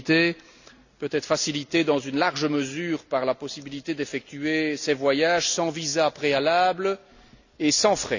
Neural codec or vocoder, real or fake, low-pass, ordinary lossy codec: none; real; 7.2 kHz; none